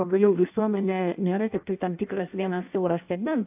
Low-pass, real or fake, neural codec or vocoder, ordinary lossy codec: 3.6 kHz; fake; codec, 16 kHz in and 24 kHz out, 0.6 kbps, FireRedTTS-2 codec; AAC, 32 kbps